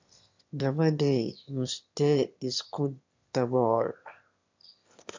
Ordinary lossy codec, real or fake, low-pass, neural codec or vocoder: MP3, 64 kbps; fake; 7.2 kHz; autoencoder, 22.05 kHz, a latent of 192 numbers a frame, VITS, trained on one speaker